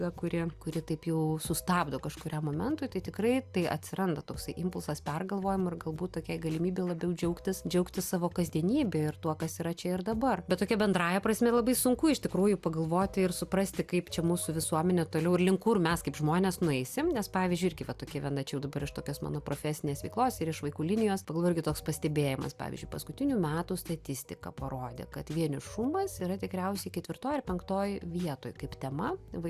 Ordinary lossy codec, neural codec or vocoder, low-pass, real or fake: Opus, 64 kbps; none; 14.4 kHz; real